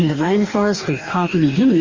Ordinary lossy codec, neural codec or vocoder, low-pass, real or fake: Opus, 32 kbps; codec, 44.1 kHz, 2.6 kbps, DAC; 7.2 kHz; fake